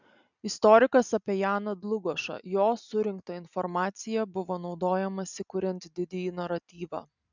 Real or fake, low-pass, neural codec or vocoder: real; 7.2 kHz; none